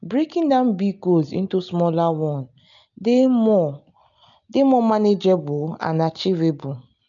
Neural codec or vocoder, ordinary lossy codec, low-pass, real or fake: none; none; 7.2 kHz; real